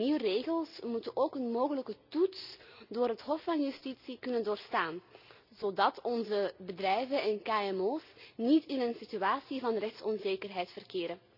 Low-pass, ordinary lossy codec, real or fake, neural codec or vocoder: 5.4 kHz; none; real; none